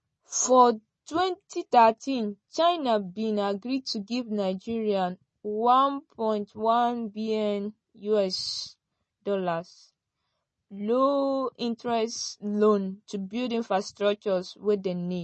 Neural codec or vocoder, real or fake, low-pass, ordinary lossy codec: none; real; 9.9 kHz; MP3, 32 kbps